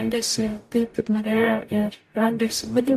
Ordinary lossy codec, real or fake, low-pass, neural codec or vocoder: MP3, 64 kbps; fake; 14.4 kHz; codec, 44.1 kHz, 0.9 kbps, DAC